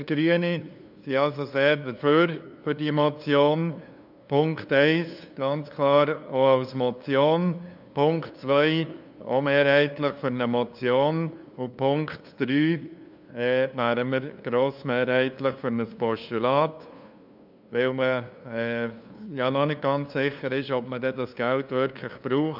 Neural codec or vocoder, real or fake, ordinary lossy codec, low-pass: codec, 16 kHz, 2 kbps, FunCodec, trained on LibriTTS, 25 frames a second; fake; none; 5.4 kHz